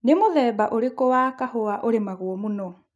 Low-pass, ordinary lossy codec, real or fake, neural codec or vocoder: none; none; real; none